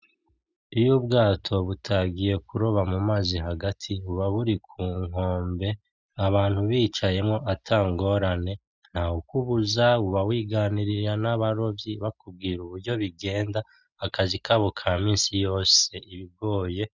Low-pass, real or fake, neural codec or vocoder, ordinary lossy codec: 7.2 kHz; real; none; Opus, 64 kbps